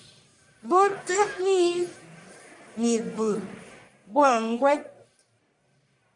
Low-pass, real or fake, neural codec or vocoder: 10.8 kHz; fake; codec, 44.1 kHz, 1.7 kbps, Pupu-Codec